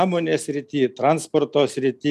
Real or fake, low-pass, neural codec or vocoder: real; 14.4 kHz; none